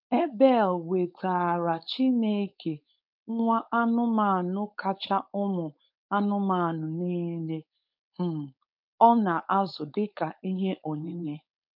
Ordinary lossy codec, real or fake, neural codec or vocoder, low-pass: none; fake; codec, 16 kHz, 4.8 kbps, FACodec; 5.4 kHz